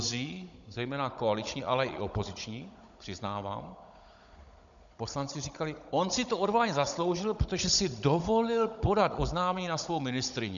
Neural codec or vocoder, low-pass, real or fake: codec, 16 kHz, 16 kbps, FunCodec, trained on Chinese and English, 50 frames a second; 7.2 kHz; fake